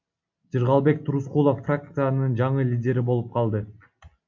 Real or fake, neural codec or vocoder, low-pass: real; none; 7.2 kHz